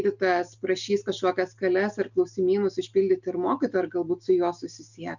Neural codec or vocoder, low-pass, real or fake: none; 7.2 kHz; real